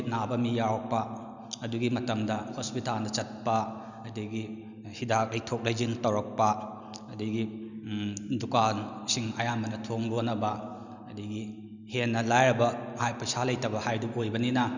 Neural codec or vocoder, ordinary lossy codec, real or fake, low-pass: none; none; real; 7.2 kHz